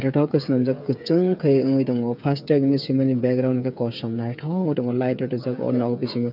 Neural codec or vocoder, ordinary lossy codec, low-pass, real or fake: codec, 16 kHz, 8 kbps, FreqCodec, smaller model; none; 5.4 kHz; fake